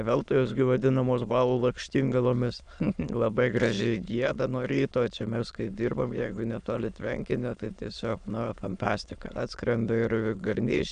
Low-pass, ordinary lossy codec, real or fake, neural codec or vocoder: 9.9 kHz; MP3, 96 kbps; fake; autoencoder, 22.05 kHz, a latent of 192 numbers a frame, VITS, trained on many speakers